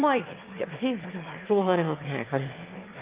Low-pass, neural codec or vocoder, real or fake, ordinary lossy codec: 3.6 kHz; autoencoder, 22.05 kHz, a latent of 192 numbers a frame, VITS, trained on one speaker; fake; Opus, 24 kbps